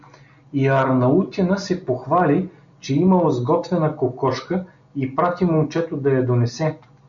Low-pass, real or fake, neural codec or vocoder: 7.2 kHz; real; none